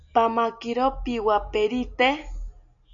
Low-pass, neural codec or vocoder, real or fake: 7.2 kHz; none; real